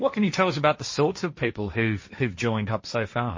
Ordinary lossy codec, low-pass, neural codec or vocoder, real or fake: MP3, 32 kbps; 7.2 kHz; codec, 16 kHz, 1.1 kbps, Voila-Tokenizer; fake